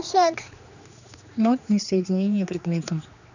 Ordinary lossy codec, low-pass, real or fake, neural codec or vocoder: none; 7.2 kHz; fake; codec, 16 kHz, 2 kbps, X-Codec, HuBERT features, trained on general audio